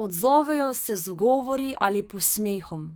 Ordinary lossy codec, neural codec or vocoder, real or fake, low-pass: none; codec, 44.1 kHz, 2.6 kbps, SNAC; fake; none